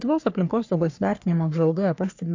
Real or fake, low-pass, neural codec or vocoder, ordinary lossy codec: fake; 7.2 kHz; codec, 44.1 kHz, 3.4 kbps, Pupu-Codec; AAC, 48 kbps